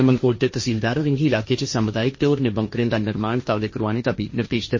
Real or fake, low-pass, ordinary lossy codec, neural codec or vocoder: fake; 7.2 kHz; MP3, 32 kbps; codec, 16 kHz, 1.1 kbps, Voila-Tokenizer